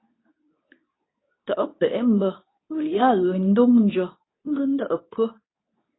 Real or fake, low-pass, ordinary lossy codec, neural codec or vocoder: fake; 7.2 kHz; AAC, 16 kbps; codec, 24 kHz, 0.9 kbps, WavTokenizer, medium speech release version 2